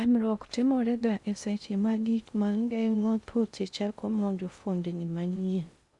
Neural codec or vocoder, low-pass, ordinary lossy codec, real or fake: codec, 16 kHz in and 24 kHz out, 0.6 kbps, FocalCodec, streaming, 2048 codes; 10.8 kHz; Opus, 64 kbps; fake